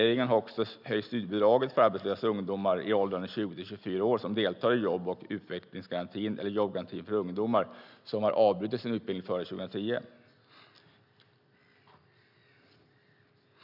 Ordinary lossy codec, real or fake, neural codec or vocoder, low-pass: none; real; none; 5.4 kHz